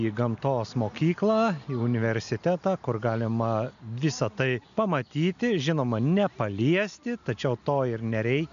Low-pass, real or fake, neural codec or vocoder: 7.2 kHz; real; none